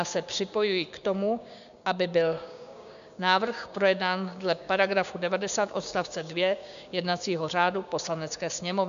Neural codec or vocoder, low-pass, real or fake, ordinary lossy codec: codec, 16 kHz, 6 kbps, DAC; 7.2 kHz; fake; MP3, 96 kbps